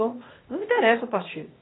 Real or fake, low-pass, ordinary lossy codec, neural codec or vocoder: fake; 7.2 kHz; AAC, 16 kbps; codec, 16 kHz, 0.8 kbps, ZipCodec